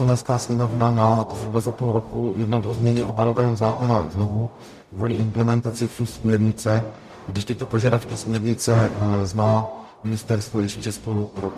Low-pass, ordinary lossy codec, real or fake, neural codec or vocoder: 14.4 kHz; MP3, 96 kbps; fake; codec, 44.1 kHz, 0.9 kbps, DAC